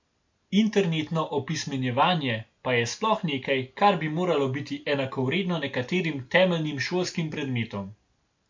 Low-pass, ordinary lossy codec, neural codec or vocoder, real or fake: 7.2 kHz; MP3, 64 kbps; none; real